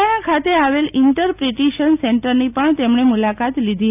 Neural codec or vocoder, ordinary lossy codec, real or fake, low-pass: none; none; real; 3.6 kHz